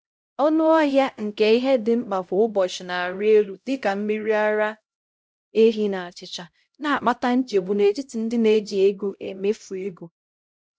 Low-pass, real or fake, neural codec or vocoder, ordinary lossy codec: none; fake; codec, 16 kHz, 0.5 kbps, X-Codec, HuBERT features, trained on LibriSpeech; none